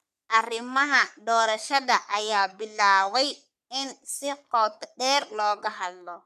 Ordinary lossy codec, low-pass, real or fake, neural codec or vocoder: none; 14.4 kHz; fake; codec, 44.1 kHz, 3.4 kbps, Pupu-Codec